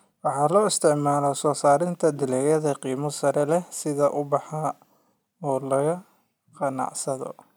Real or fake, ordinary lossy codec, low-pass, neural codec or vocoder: real; none; none; none